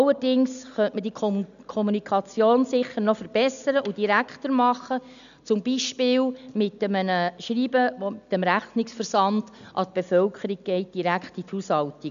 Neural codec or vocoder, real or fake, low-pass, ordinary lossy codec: none; real; 7.2 kHz; none